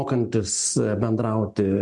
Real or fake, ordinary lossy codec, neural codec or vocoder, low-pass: real; MP3, 64 kbps; none; 10.8 kHz